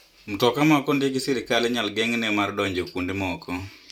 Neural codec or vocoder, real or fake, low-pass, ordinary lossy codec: vocoder, 44.1 kHz, 128 mel bands every 512 samples, BigVGAN v2; fake; 19.8 kHz; none